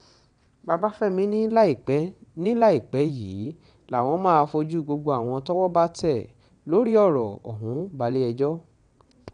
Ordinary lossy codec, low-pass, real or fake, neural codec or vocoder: none; 9.9 kHz; fake; vocoder, 22.05 kHz, 80 mel bands, WaveNeXt